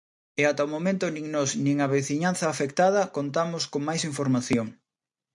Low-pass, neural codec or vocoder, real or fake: 10.8 kHz; none; real